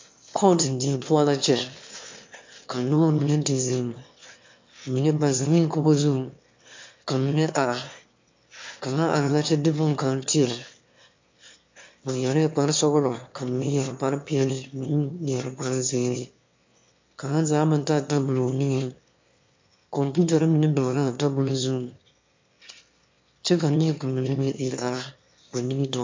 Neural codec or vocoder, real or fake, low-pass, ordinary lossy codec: autoencoder, 22.05 kHz, a latent of 192 numbers a frame, VITS, trained on one speaker; fake; 7.2 kHz; MP3, 48 kbps